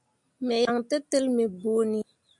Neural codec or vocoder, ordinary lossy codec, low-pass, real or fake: none; MP3, 64 kbps; 10.8 kHz; real